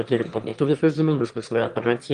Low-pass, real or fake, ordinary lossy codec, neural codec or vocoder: 9.9 kHz; fake; Opus, 24 kbps; autoencoder, 22.05 kHz, a latent of 192 numbers a frame, VITS, trained on one speaker